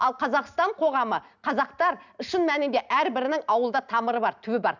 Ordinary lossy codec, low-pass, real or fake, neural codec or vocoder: none; 7.2 kHz; real; none